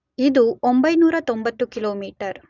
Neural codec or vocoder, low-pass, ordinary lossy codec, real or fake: none; 7.2 kHz; none; real